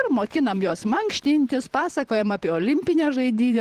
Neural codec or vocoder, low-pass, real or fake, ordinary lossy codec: vocoder, 44.1 kHz, 128 mel bands, Pupu-Vocoder; 14.4 kHz; fake; Opus, 16 kbps